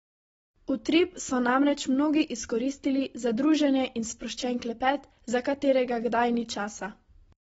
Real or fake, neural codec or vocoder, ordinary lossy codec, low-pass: real; none; AAC, 24 kbps; 10.8 kHz